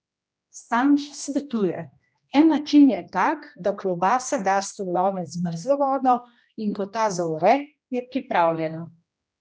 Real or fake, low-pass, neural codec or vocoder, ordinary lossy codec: fake; none; codec, 16 kHz, 1 kbps, X-Codec, HuBERT features, trained on general audio; none